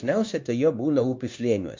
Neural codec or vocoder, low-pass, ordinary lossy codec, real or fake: codec, 16 kHz, 0.9 kbps, LongCat-Audio-Codec; 7.2 kHz; MP3, 48 kbps; fake